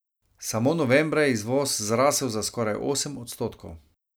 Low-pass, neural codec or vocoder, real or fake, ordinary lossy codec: none; none; real; none